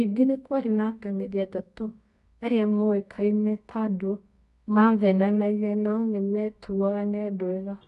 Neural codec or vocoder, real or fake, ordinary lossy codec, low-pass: codec, 24 kHz, 0.9 kbps, WavTokenizer, medium music audio release; fake; MP3, 96 kbps; 10.8 kHz